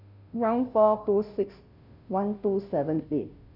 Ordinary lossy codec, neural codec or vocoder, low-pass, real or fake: none; codec, 16 kHz, 0.5 kbps, FunCodec, trained on Chinese and English, 25 frames a second; 5.4 kHz; fake